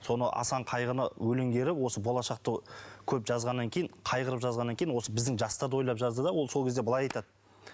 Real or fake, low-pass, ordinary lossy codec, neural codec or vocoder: real; none; none; none